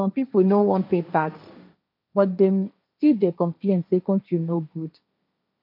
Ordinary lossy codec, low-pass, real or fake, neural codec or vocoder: none; 5.4 kHz; fake; codec, 16 kHz, 1.1 kbps, Voila-Tokenizer